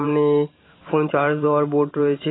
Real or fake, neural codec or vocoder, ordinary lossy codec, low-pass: fake; vocoder, 44.1 kHz, 128 mel bands every 512 samples, BigVGAN v2; AAC, 16 kbps; 7.2 kHz